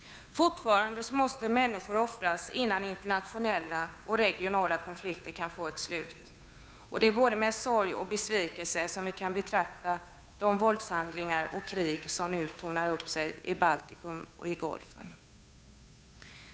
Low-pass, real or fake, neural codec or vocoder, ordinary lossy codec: none; fake; codec, 16 kHz, 2 kbps, FunCodec, trained on Chinese and English, 25 frames a second; none